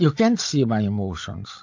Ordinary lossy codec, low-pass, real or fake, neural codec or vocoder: AAC, 48 kbps; 7.2 kHz; fake; codec, 16 kHz, 16 kbps, FreqCodec, larger model